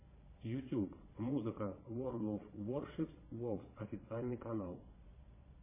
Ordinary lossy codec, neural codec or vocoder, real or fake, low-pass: MP3, 16 kbps; vocoder, 22.05 kHz, 80 mel bands, Vocos; fake; 3.6 kHz